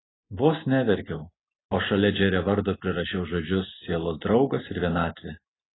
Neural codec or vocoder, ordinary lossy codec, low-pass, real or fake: none; AAC, 16 kbps; 7.2 kHz; real